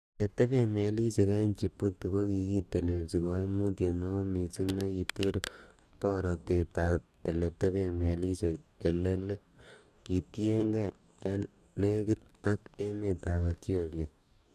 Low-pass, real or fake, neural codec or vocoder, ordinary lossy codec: 14.4 kHz; fake; codec, 44.1 kHz, 2.6 kbps, DAC; none